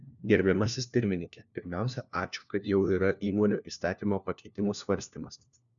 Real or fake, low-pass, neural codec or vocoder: fake; 7.2 kHz; codec, 16 kHz, 1 kbps, FunCodec, trained on LibriTTS, 50 frames a second